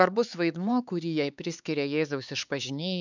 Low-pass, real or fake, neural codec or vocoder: 7.2 kHz; fake; codec, 16 kHz, 4 kbps, X-Codec, HuBERT features, trained on LibriSpeech